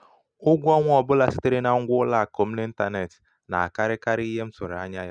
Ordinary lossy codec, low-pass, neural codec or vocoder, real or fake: none; 9.9 kHz; none; real